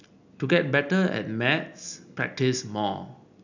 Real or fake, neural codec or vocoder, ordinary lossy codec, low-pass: real; none; none; 7.2 kHz